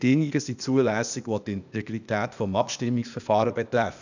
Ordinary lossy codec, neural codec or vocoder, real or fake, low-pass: none; codec, 16 kHz, 0.8 kbps, ZipCodec; fake; 7.2 kHz